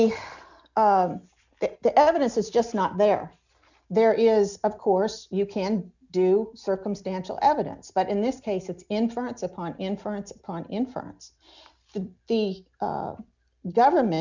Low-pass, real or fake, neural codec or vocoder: 7.2 kHz; real; none